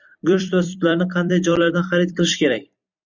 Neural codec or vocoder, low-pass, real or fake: vocoder, 44.1 kHz, 128 mel bands every 256 samples, BigVGAN v2; 7.2 kHz; fake